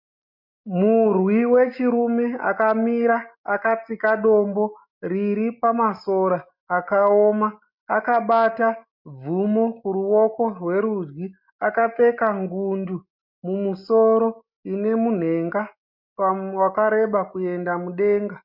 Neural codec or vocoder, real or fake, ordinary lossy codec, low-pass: none; real; MP3, 48 kbps; 5.4 kHz